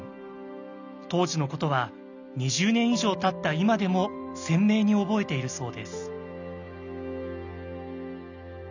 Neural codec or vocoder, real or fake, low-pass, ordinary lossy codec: none; real; 7.2 kHz; none